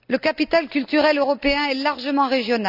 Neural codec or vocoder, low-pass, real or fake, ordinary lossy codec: none; 5.4 kHz; real; none